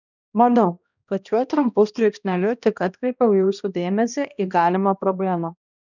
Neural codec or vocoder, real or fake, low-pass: codec, 16 kHz, 1 kbps, X-Codec, HuBERT features, trained on balanced general audio; fake; 7.2 kHz